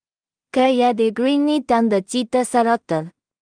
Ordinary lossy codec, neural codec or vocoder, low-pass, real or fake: Opus, 32 kbps; codec, 16 kHz in and 24 kHz out, 0.4 kbps, LongCat-Audio-Codec, two codebook decoder; 9.9 kHz; fake